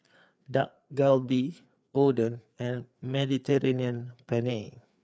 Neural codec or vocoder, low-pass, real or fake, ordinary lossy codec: codec, 16 kHz, 4 kbps, FreqCodec, larger model; none; fake; none